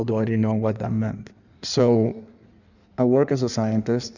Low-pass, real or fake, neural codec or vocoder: 7.2 kHz; fake; codec, 16 kHz in and 24 kHz out, 1.1 kbps, FireRedTTS-2 codec